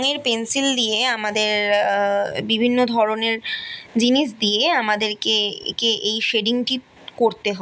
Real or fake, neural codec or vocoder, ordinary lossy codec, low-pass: real; none; none; none